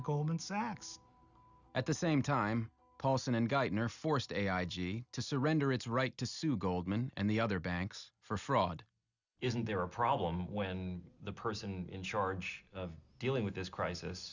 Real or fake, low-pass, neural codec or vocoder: real; 7.2 kHz; none